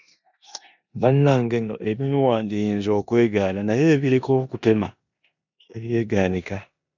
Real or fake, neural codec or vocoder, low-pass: fake; codec, 16 kHz in and 24 kHz out, 0.9 kbps, LongCat-Audio-Codec, four codebook decoder; 7.2 kHz